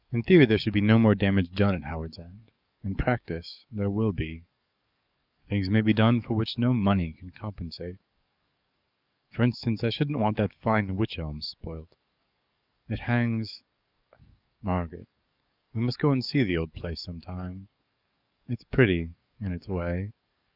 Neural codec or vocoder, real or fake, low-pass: codec, 44.1 kHz, 7.8 kbps, DAC; fake; 5.4 kHz